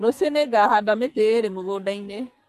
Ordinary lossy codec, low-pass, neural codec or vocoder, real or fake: MP3, 64 kbps; 14.4 kHz; codec, 44.1 kHz, 2.6 kbps, SNAC; fake